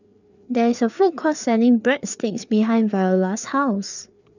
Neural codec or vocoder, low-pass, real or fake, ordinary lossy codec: codec, 16 kHz, 4 kbps, FreqCodec, larger model; 7.2 kHz; fake; none